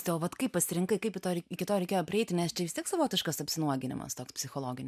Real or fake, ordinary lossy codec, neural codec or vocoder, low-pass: real; MP3, 96 kbps; none; 14.4 kHz